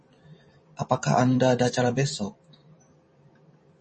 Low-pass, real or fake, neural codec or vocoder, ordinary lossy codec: 10.8 kHz; fake; vocoder, 44.1 kHz, 128 mel bands every 512 samples, BigVGAN v2; MP3, 32 kbps